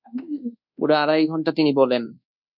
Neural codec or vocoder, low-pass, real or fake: codec, 24 kHz, 1.2 kbps, DualCodec; 5.4 kHz; fake